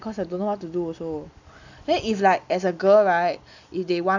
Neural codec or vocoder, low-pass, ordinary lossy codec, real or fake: none; 7.2 kHz; none; real